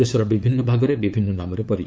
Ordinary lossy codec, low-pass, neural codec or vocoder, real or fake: none; none; codec, 16 kHz, 8 kbps, FunCodec, trained on LibriTTS, 25 frames a second; fake